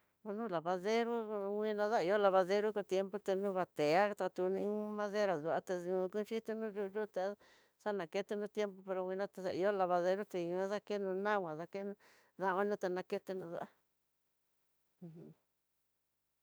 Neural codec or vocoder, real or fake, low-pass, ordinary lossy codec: autoencoder, 48 kHz, 32 numbers a frame, DAC-VAE, trained on Japanese speech; fake; none; none